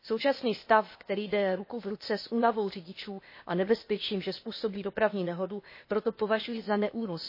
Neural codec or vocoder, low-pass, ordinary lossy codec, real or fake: codec, 16 kHz, 0.8 kbps, ZipCodec; 5.4 kHz; MP3, 24 kbps; fake